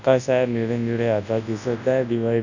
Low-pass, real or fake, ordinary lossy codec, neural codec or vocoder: 7.2 kHz; fake; none; codec, 24 kHz, 0.9 kbps, WavTokenizer, large speech release